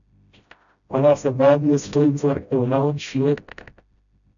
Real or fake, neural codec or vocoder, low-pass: fake; codec, 16 kHz, 0.5 kbps, FreqCodec, smaller model; 7.2 kHz